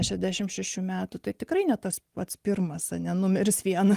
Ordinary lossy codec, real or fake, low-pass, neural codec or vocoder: Opus, 24 kbps; real; 14.4 kHz; none